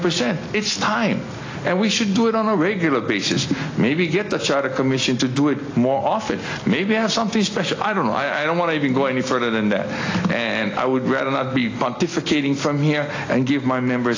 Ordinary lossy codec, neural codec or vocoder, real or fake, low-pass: AAC, 32 kbps; none; real; 7.2 kHz